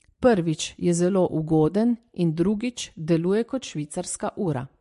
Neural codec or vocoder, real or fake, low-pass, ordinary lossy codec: none; real; 14.4 kHz; MP3, 48 kbps